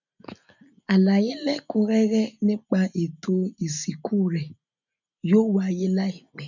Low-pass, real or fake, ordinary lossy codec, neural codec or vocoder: 7.2 kHz; real; none; none